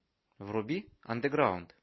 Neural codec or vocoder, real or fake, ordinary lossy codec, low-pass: none; real; MP3, 24 kbps; 7.2 kHz